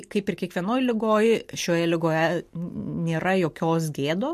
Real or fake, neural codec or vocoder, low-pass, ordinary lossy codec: real; none; 14.4 kHz; MP3, 64 kbps